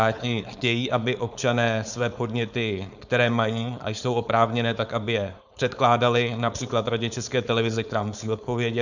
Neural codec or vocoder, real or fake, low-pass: codec, 16 kHz, 4.8 kbps, FACodec; fake; 7.2 kHz